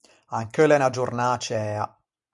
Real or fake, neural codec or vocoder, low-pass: real; none; 10.8 kHz